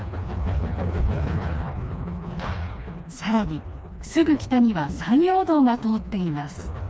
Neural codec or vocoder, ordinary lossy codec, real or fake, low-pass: codec, 16 kHz, 2 kbps, FreqCodec, smaller model; none; fake; none